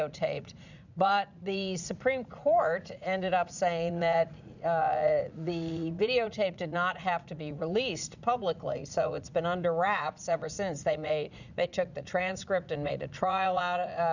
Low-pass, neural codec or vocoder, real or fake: 7.2 kHz; vocoder, 44.1 kHz, 80 mel bands, Vocos; fake